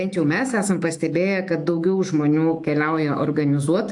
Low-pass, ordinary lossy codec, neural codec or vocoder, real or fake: 10.8 kHz; AAC, 64 kbps; autoencoder, 48 kHz, 128 numbers a frame, DAC-VAE, trained on Japanese speech; fake